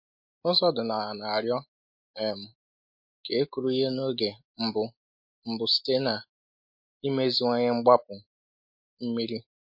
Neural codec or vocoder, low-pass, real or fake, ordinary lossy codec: none; 5.4 kHz; real; MP3, 32 kbps